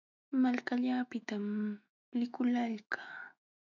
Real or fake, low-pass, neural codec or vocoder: fake; 7.2 kHz; autoencoder, 48 kHz, 128 numbers a frame, DAC-VAE, trained on Japanese speech